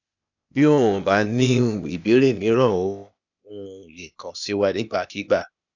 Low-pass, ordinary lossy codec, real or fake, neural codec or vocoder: 7.2 kHz; none; fake; codec, 16 kHz, 0.8 kbps, ZipCodec